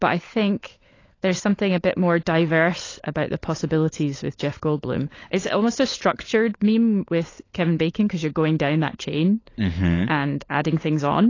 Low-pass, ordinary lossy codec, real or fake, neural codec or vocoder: 7.2 kHz; AAC, 32 kbps; fake; codec, 16 kHz, 16 kbps, FunCodec, trained on LibriTTS, 50 frames a second